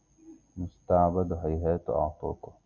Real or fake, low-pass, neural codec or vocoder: real; 7.2 kHz; none